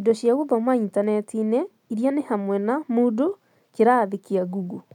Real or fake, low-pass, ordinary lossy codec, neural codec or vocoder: real; 19.8 kHz; none; none